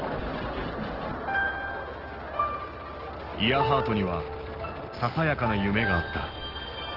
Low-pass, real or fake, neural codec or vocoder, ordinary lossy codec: 5.4 kHz; real; none; Opus, 16 kbps